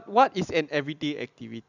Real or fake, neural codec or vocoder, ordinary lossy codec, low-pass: real; none; none; 7.2 kHz